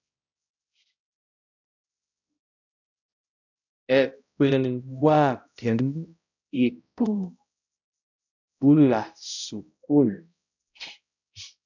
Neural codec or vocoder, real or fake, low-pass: codec, 16 kHz, 0.5 kbps, X-Codec, HuBERT features, trained on balanced general audio; fake; 7.2 kHz